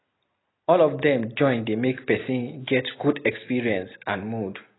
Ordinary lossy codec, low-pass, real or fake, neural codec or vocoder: AAC, 16 kbps; 7.2 kHz; real; none